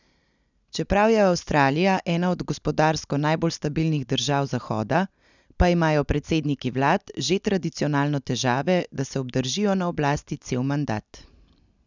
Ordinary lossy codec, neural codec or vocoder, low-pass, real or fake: none; none; 7.2 kHz; real